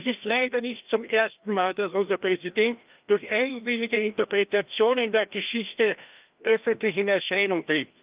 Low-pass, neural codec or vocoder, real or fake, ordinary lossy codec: 3.6 kHz; codec, 16 kHz, 1 kbps, FreqCodec, larger model; fake; Opus, 32 kbps